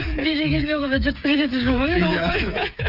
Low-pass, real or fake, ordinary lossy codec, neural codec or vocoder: 5.4 kHz; fake; none; codec, 16 kHz, 8 kbps, FreqCodec, smaller model